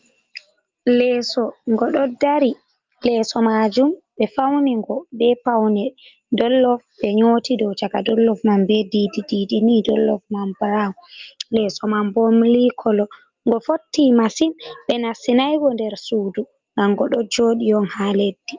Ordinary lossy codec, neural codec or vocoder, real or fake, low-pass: Opus, 32 kbps; none; real; 7.2 kHz